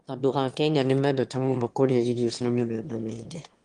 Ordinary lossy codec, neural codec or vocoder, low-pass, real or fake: Opus, 24 kbps; autoencoder, 22.05 kHz, a latent of 192 numbers a frame, VITS, trained on one speaker; 9.9 kHz; fake